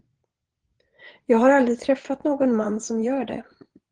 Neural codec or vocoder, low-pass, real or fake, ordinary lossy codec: vocoder, 22.05 kHz, 80 mel bands, Vocos; 9.9 kHz; fake; Opus, 16 kbps